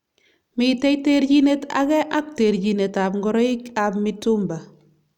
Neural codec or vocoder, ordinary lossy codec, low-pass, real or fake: none; none; 19.8 kHz; real